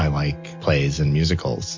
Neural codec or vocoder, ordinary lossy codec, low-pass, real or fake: none; MP3, 48 kbps; 7.2 kHz; real